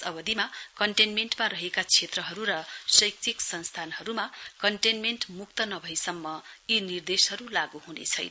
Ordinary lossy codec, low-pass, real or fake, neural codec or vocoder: none; none; real; none